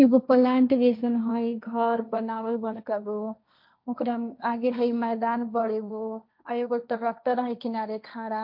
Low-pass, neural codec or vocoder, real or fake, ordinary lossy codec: 5.4 kHz; codec, 16 kHz, 1.1 kbps, Voila-Tokenizer; fake; MP3, 48 kbps